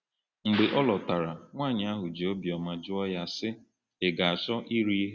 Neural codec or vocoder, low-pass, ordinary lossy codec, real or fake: none; 7.2 kHz; Opus, 64 kbps; real